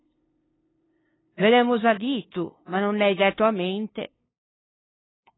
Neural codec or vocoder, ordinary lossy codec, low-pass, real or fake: codec, 16 kHz, 2 kbps, FunCodec, trained on LibriTTS, 25 frames a second; AAC, 16 kbps; 7.2 kHz; fake